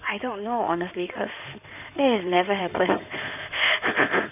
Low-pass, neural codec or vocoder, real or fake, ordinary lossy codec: 3.6 kHz; codec, 16 kHz in and 24 kHz out, 2.2 kbps, FireRedTTS-2 codec; fake; none